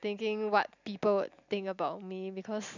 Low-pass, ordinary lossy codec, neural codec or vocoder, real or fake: 7.2 kHz; none; none; real